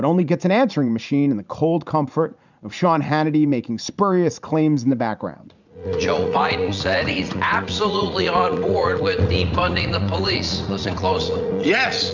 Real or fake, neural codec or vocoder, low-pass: fake; vocoder, 44.1 kHz, 80 mel bands, Vocos; 7.2 kHz